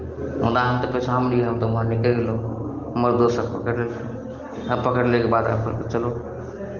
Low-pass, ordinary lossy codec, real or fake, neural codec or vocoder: 7.2 kHz; Opus, 16 kbps; real; none